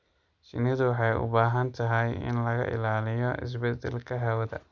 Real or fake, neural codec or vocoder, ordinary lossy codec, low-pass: real; none; Opus, 64 kbps; 7.2 kHz